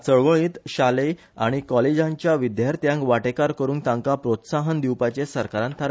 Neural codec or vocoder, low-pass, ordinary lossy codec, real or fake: none; none; none; real